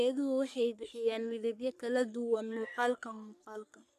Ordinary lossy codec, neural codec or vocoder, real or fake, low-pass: none; codec, 44.1 kHz, 3.4 kbps, Pupu-Codec; fake; 14.4 kHz